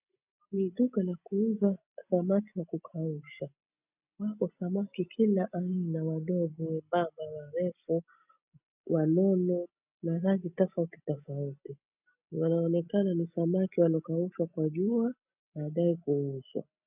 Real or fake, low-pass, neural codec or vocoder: real; 3.6 kHz; none